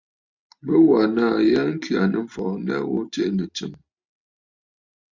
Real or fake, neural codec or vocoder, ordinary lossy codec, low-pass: real; none; MP3, 64 kbps; 7.2 kHz